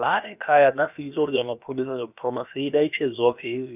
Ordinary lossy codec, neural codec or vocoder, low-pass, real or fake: none; codec, 16 kHz, about 1 kbps, DyCAST, with the encoder's durations; 3.6 kHz; fake